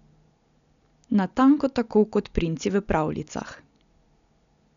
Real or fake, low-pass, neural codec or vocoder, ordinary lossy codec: real; 7.2 kHz; none; none